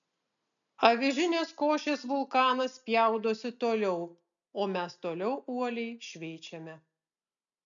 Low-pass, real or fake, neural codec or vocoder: 7.2 kHz; real; none